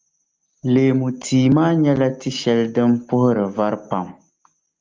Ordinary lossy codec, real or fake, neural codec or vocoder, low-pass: Opus, 24 kbps; real; none; 7.2 kHz